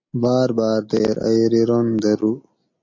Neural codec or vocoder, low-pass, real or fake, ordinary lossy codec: none; 7.2 kHz; real; MP3, 48 kbps